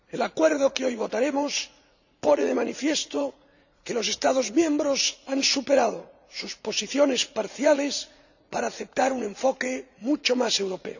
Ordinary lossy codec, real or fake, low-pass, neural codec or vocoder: none; fake; 7.2 kHz; vocoder, 44.1 kHz, 80 mel bands, Vocos